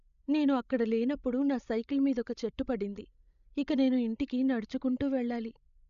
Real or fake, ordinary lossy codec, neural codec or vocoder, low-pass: fake; none; codec, 16 kHz, 16 kbps, FreqCodec, larger model; 7.2 kHz